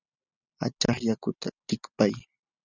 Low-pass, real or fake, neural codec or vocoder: 7.2 kHz; real; none